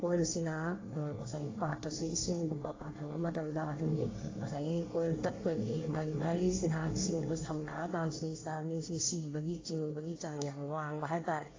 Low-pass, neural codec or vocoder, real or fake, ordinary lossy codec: 7.2 kHz; codec, 24 kHz, 1 kbps, SNAC; fake; AAC, 32 kbps